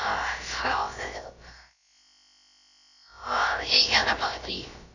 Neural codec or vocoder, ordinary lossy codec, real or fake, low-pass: codec, 16 kHz, about 1 kbps, DyCAST, with the encoder's durations; none; fake; 7.2 kHz